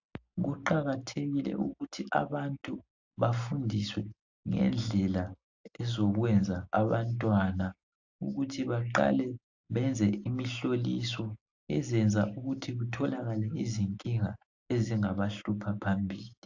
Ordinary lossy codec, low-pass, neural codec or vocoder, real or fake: AAC, 48 kbps; 7.2 kHz; none; real